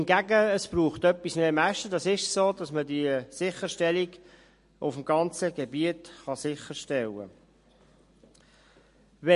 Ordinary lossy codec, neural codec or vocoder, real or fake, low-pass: MP3, 48 kbps; none; real; 10.8 kHz